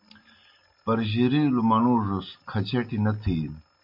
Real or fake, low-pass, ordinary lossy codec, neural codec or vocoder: real; 5.4 kHz; MP3, 48 kbps; none